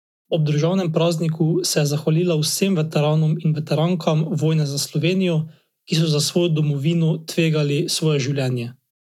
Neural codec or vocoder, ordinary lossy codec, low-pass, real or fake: none; none; 19.8 kHz; real